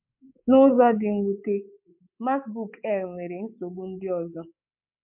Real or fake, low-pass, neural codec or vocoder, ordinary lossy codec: fake; 3.6 kHz; codec, 24 kHz, 3.1 kbps, DualCodec; MP3, 32 kbps